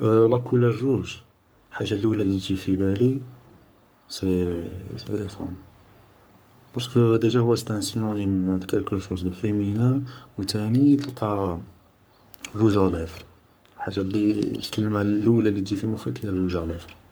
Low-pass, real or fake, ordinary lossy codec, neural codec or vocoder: none; fake; none; codec, 44.1 kHz, 3.4 kbps, Pupu-Codec